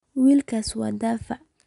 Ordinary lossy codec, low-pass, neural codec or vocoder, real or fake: MP3, 96 kbps; 10.8 kHz; none; real